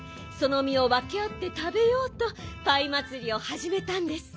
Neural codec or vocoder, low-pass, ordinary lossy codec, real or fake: none; none; none; real